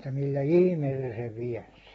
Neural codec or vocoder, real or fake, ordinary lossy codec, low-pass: none; real; AAC, 24 kbps; 19.8 kHz